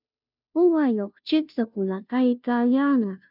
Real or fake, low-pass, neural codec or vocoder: fake; 5.4 kHz; codec, 16 kHz, 0.5 kbps, FunCodec, trained on Chinese and English, 25 frames a second